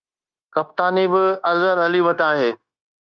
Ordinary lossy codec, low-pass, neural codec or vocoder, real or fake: Opus, 32 kbps; 7.2 kHz; codec, 16 kHz, 0.9 kbps, LongCat-Audio-Codec; fake